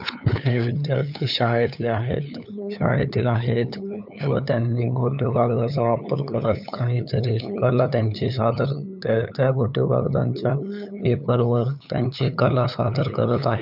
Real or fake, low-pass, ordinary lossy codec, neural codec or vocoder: fake; 5.4 kHz; none; codec, 16 kHz, 8 kbps, FunCodec, trained on LibriTTS, 25 frames a second